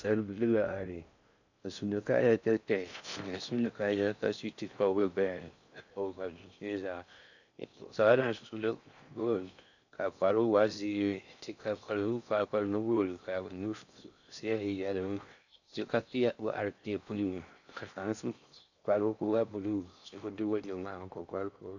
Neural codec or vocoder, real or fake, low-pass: codec, 16 kHz in and 24 kHz out, 0.6 kbps, FocalCodec, streaming, 4096 codes; fake; 7.2 kHz